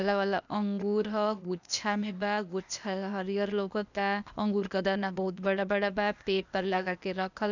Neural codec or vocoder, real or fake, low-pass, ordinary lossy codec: codec, 16 kHz, 0.8 kbps, ZipCodec; fake; 7.2 kHz; none